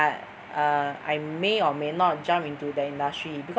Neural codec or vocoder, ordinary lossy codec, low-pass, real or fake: none; none; none; real